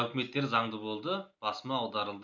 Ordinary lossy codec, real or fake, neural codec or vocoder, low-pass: none; real; none; 7.2 kHz